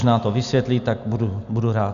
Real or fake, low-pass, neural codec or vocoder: real; 7.2 kHz; none